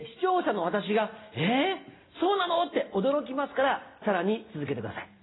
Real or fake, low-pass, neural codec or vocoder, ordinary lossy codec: real; 7.2 kHz; none; AAC, 16 kbps